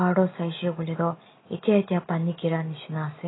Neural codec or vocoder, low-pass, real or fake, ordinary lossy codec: none; 7.2 kHz; real; AAC, 16 kbps